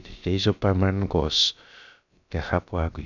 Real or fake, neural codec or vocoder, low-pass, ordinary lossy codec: fake; codec, 16 kHz, about 1 kbps, DyCAST, with the encoder's durations; 7.2 kHz; none